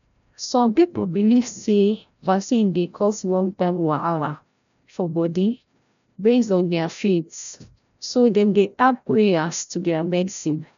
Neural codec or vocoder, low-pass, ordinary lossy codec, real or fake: codec, 16 kHz, 0.5 kbps, FreqCodec, larger model; 7.2 kHz; none; fake